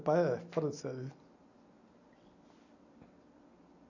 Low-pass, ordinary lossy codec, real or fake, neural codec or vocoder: 7.2 kHz; none; real; none